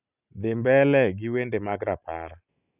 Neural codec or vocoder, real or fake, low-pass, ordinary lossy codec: none; real; 3.6 kHz; none